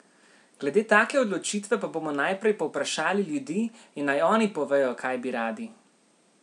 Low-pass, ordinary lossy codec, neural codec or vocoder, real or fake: 10.8 kHz; none; none; real